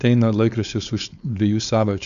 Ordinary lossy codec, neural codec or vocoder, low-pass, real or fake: AAC, 96 kbps; codec, 16 kHz, 4.8 kbps, FACodec; 7.2 kHz; fake